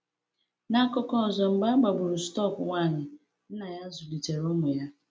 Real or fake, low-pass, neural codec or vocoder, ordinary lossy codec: real; none; none; none